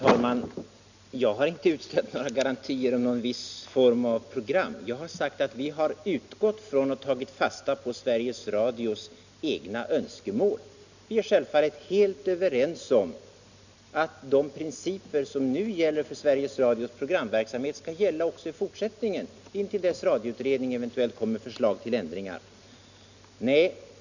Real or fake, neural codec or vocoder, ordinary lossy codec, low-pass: real; none; none; 7.2 kHz